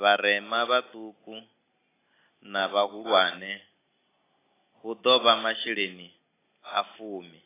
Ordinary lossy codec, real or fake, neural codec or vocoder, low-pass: AAC, 16 kbps; real; none; 3.6 kHz